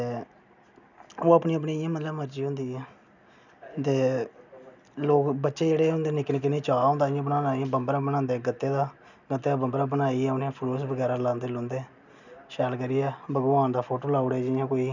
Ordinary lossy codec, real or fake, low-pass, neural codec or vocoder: none; real; 7.2 kHz; none